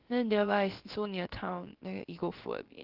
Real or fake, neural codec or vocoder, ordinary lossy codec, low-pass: fake; codec, 16 kHz, about 1 kbps, DyCAST, with the encoder's durations; Opus, 16 kbps; 5.4 kHz